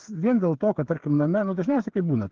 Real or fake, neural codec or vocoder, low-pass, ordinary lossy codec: fake; codec, 16 kHz, 8 kbps, FreqCodec, smaller model; 7.2 kHz; Opus, 16 kbps